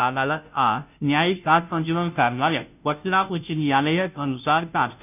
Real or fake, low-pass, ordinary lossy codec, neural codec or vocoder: fake; 3.6 kHz; none; codec, 16 kHz, 0.5 kbps, FunCodec, trained on Chinese and English, 25 frames a second